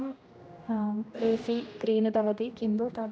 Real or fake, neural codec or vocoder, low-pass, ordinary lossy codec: fake; codec, 16 kHz, 1 kbps, X-Codec, HuBERT features, trained on general audio; none; none